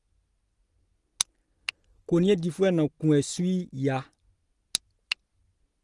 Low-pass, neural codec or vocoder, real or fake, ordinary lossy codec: 10.8 kHz; none; real; Opus, 24 kbps